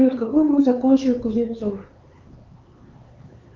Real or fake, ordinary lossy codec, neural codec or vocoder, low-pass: fake; Opus, 24 kbps; codec, 16 kHz, 4 kbps, X-Codec, HuBERT features, trained on LibriSpeech; 7.2 kHz